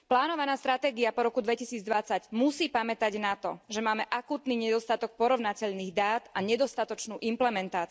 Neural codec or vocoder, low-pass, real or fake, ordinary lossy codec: none; none; real; none